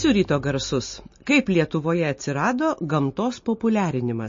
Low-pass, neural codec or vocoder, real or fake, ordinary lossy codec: 7.2 kHz; none; real; MP3, 32 kbps